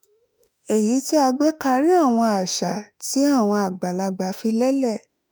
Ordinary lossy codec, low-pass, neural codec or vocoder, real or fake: none; none; autoencoder, 48 kHz, 32 numbers a frame, DAC-VAE, trained on Japanese speech; fake